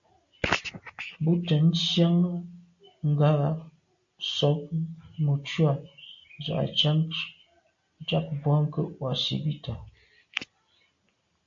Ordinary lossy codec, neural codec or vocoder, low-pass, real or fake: AAC, 64 kbps; none; 7.2 kHz; real